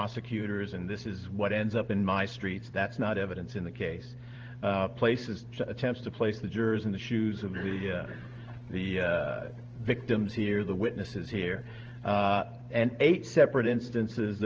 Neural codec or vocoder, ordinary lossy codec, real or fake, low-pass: vocoder, 44.1 kHz, 128 mel bands every 512 samples, BigVGAN v2; Opus, 16 kbps; fake; 7.2 kHz